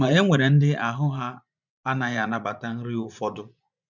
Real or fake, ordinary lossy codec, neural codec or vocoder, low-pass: fake; none; vocoder, 24 kHz, 100 mel bands, Vocos; 7.2 kHz